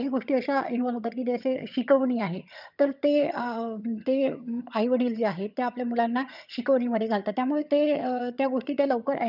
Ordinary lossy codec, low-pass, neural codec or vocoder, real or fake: none; 5.4 kHz; vocoder, 22.05 kHz, 80 mel bands, HiFi-GAN; fake